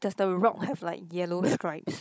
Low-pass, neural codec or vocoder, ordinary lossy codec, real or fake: none; codec, 16 kHz, 16 kbps, FunCodec, trained on Chinese and English, 50 frames a second; none; fake